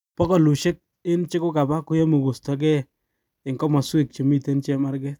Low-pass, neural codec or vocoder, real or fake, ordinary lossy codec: 19.8 kHz; none; real; none